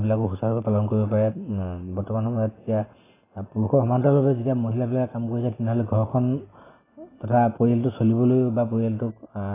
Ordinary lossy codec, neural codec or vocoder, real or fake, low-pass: AAC, 16 kbps; none; real; 3.6 kHz